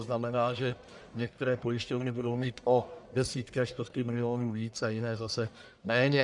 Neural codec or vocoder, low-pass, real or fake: codec, 44.1 kHz, 1.7 kbps, Pupu-Codec; 10.8 kHz; fake